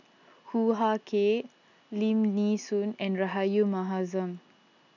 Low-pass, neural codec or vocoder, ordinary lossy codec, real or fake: 7.2 kHz; none; none; real